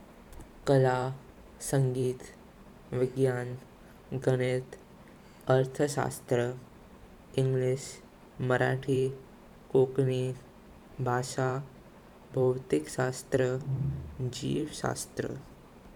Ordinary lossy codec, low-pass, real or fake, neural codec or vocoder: none; 19.8 kHz; fake; vocoder, 44.1 kHz, 128 mel bands every 512 samples, BigVGAN v2